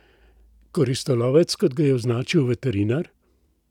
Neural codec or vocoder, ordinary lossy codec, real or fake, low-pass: none; none; real; 19.8 kHz